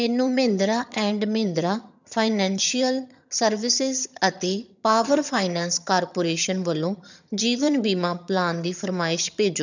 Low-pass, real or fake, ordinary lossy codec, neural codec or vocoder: 7.2 kHz; fake; none; vocoder, 22.05 kHz, 80 mel bands, HiFi-GAN